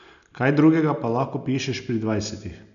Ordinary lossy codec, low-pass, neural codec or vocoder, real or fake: none; 7.2 kHz; none; real